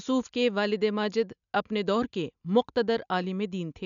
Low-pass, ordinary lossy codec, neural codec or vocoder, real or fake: 7.2 kHz; none; none; real